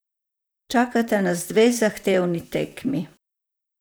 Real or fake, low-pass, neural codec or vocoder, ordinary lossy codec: fake; none; vocoder, 44.1 kHz, 128 mel bands, Pupu-Vocoder; none